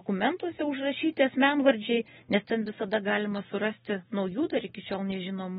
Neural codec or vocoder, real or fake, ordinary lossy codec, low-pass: none; real; AAC, 16 kbps; 19.8 kHz